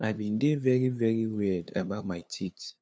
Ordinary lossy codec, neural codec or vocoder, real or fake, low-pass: none; codec, 16 kHz, 2 kbps, FunCodec, trained on LibriTTS, 25 frames a second; fake; none